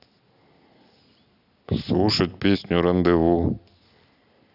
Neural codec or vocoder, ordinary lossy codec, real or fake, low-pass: none; none; real; 5.4 kHz